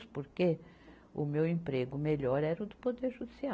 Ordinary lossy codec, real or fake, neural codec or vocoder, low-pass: none; real; none; none